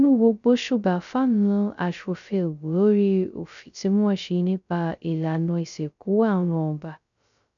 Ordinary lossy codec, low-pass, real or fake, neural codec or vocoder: none; 7.2 kHz; fake; codec, 16 kHz, 0.2 kbps, FocalCodec